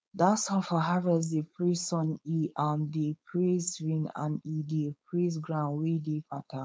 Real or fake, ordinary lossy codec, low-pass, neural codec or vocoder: fake; none; none; codec, 16 kHz, 4.8 kbps, FACodec